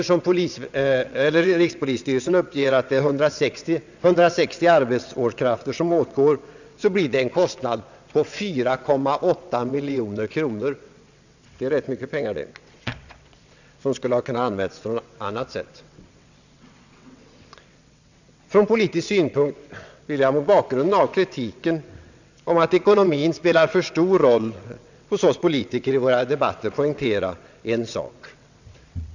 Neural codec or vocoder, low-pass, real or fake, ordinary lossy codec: vocoder, 22.05 kHz, 80 mel bands, WaveNeXt; 7.2 kHz; fake; none